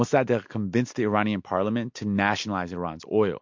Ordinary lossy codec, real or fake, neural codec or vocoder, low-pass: MP3, 48 kbps; real; none; 7.2 kHz